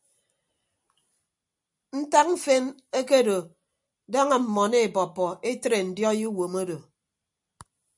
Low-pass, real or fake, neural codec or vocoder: 10.8 kHz; real; none